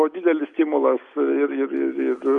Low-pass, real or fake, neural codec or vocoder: 10.8 kHz; real; none